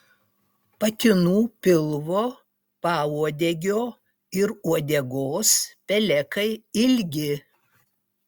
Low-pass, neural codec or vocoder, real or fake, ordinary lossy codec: 19.8 kHz; none; real; Opus, 64 kbps